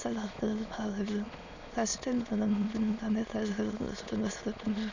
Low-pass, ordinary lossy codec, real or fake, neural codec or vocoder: 7.2 kHz; none; fake; autoencoder, 22.05 kHz, a latent of 192 numbers a frame, VITS, trained on many speakers